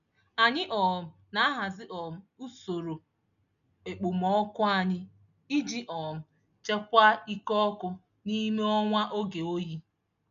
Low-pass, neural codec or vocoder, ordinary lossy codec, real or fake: 7.2 kHz; none; none; real